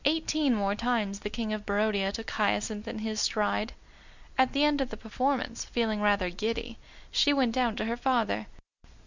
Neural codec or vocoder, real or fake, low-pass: none; real; 7.2 kHz